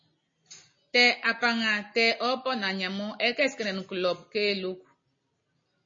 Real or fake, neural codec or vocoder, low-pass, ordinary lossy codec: real; none; 7.2 kHz; MP3, 32 kbps